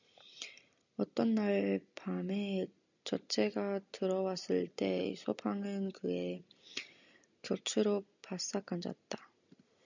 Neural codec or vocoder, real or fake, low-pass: none; real; 7.2 kHz